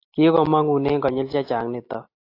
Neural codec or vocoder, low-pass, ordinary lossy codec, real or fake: none; 5.4 kHz; AAC, 32 kbps; real